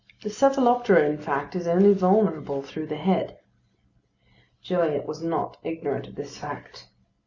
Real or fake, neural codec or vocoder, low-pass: real; none; 7.2 kHz